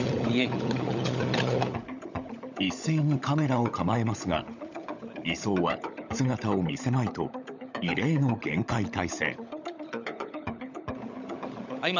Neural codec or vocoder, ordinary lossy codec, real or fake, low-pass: codec, 16 kHz, 16 kbps, FunCodec, trained on LibriTTS, 50 frames a second; none; fake; 7.2 kHz